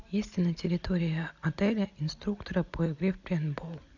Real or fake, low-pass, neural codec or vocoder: real; 7.2 kHz; none